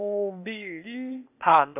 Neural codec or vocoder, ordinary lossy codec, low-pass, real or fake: codec, 16 kHz, 0.8 kbps, ZipCodec; none; 3.6 kHz; fake